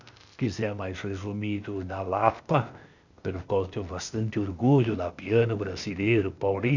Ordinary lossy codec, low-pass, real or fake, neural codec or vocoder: none; 7.2 kHz; fake; codec, 16 kHz, 0.8 kbps, ZipCodec